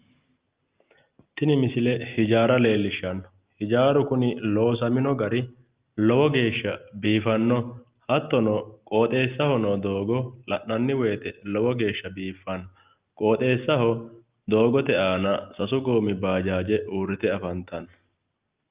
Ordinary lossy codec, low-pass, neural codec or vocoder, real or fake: Opus, 32 kbps; 3.6 kHz; none; real